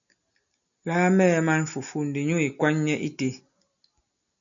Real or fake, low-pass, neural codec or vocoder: real; 7.2 kHz; none